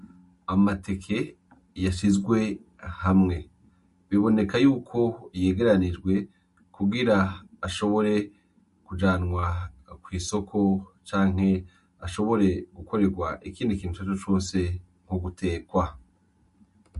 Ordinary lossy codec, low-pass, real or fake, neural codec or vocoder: MP3, 48 kbps; 14.4 kHz; real; none